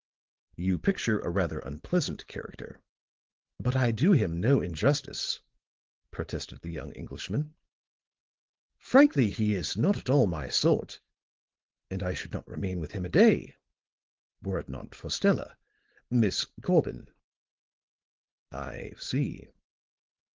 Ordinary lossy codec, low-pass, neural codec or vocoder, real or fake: Opus, 16 kbps; 7.2 kHz; codec, 16 kHz, 4.8 kbps, FACodec; fake